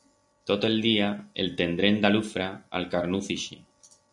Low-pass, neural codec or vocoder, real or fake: 10.8 kHz; none; real